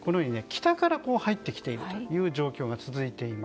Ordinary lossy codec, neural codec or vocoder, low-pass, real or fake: none; none; none; real